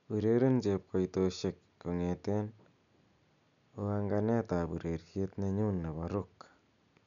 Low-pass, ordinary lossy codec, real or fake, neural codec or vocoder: 7.2 kHz; none; real; none